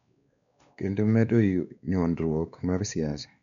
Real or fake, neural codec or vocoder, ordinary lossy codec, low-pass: fake; codec, 16 kHz, 2 kbps, X-Codec, WavLM features, trained on Multilingual LibriSpeech; none; 7.2 kHz